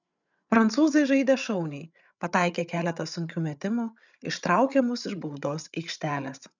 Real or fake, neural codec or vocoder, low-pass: fake; codec, 16 kHz, 8 kbps, FreqCodec, larger model; 7.2 kHz